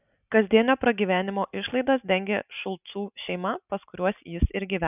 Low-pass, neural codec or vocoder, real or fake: 3.6 kHz; none; real